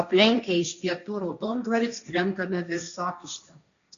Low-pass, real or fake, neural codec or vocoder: 7.2 kHz; fake; codec, 16 kHz, 1.1 kbps, Voila-Tokenizer